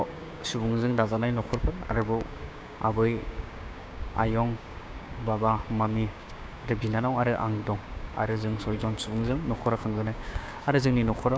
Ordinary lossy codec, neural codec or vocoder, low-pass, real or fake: none; codec, 16 kHz, 6 kbps, DAC; none; fake